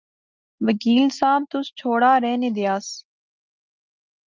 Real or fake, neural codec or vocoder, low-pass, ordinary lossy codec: real; none; 7.2 kHz; Opus, 24 kbps